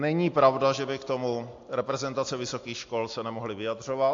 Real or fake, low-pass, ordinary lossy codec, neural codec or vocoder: real; 7.2 kHz; AAC, 48 kbps; none